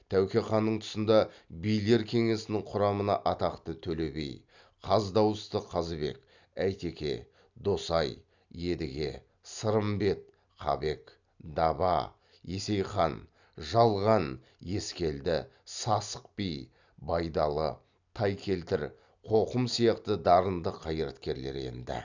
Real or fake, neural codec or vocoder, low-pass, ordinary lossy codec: real; none; 7.2 kHz; none